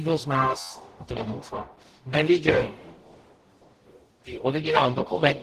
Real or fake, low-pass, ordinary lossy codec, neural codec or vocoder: fake; 14.4 kHz; Opus, 16 kbps; codec, 44.1 kHz, 0.9 kbps, DAC